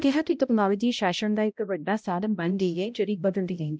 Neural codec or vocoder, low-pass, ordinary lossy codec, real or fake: codec, 16 kHz, 0.5 kbps, X-Codec, HuBERT features, trained on balanced general audio; none; none; fake